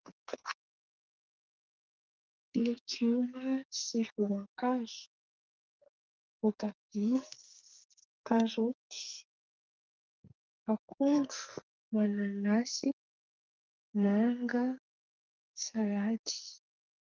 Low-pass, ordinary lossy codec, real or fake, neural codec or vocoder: 7.2 kHz; Opus, 32 kbps; fake; codec, 44.1 kHz, 2.6 kbps, SNAC